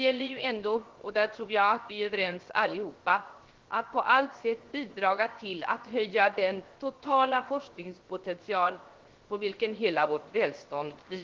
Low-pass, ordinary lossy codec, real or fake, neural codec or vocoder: 7.2 kHz; Opus, 16 kbps; fake; codec, 16 kHz, 0.7 kbps, FocalCodec